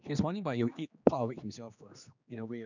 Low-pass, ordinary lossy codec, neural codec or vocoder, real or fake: 7.2 kHz; none; codec, 16 kHz, 2 kbps, X-Codec, HuBERT features, trained on general audio; fake